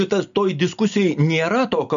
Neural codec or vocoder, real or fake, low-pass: none; real; 7.2 kHz